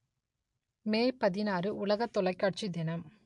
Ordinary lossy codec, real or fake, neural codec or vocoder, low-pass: MP3, 64 kbps; real; none; 10.8 kHz